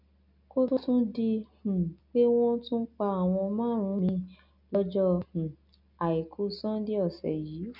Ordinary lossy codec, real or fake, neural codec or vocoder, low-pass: none; real; none; 5.4 kHz